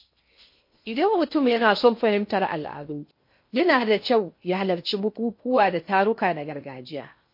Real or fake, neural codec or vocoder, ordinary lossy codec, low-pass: fake; codec, 16 kHz in and 24 kHz out, 0.6 kbps, FocalCodec, streaming, 2048 codes; MP3, 32 kbps; 5.4 kHz